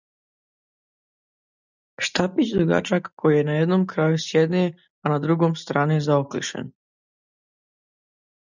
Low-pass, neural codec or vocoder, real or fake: 7.2 kHz; none; real